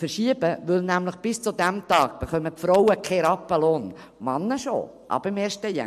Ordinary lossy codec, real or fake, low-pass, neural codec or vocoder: MP3, 64 kbps; real; 14.4 kHz; none